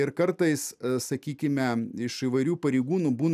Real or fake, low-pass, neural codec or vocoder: real; 14.4 kHz; none